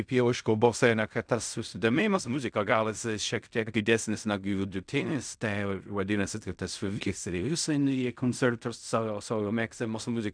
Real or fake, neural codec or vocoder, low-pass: fake; codec, 16 kHz in and 24 kHz out, 0.4 kbps, LongCat-Audio-Codec, fine tuned four codebook decoder; 9.9 kHz